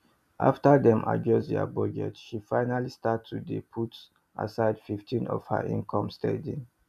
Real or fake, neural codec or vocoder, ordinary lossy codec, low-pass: real; none; none; 14.4 kHz